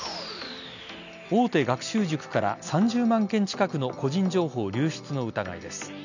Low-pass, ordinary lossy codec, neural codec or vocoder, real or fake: 7.2 kHz; none; none; real